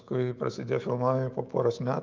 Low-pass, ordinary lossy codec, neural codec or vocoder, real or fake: 7.2 kHz; Opus, 24 kbps; autoencoder, 48 kHz, 128 numbers a frame, DAC-VAE, trained on Japanese speech; fake